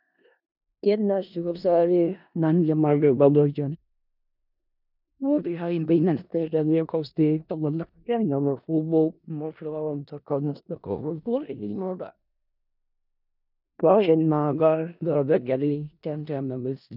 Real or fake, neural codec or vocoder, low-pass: fake; codec, 16 kHz in and 24 kHz out, 0.4 kbps, LongCat-Audio-Codec, four codebook decoder; 5.4 kHz